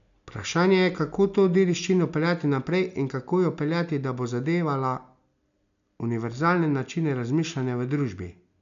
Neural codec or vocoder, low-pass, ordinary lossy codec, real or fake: none; 7.2 kHz; none; real